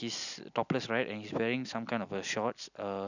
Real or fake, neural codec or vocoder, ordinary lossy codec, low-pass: real; none; none; 7.2 kHz